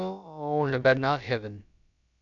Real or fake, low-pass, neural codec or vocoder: fake; 7.2 kHz; codec, 16 kHz, about 1 kbps, DyCAST, with the encoder's durations